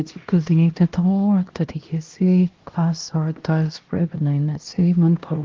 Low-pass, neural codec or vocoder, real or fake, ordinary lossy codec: 7.2 kHz; codec, 16 kHz, 1 kbps, X-Codec, HuBERT features, trained on LibriSpeech; fake; Opus, 16 kbps